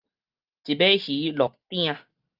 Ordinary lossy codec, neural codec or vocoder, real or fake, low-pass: Opus, 32 kbps; none; real; 5.4 kHz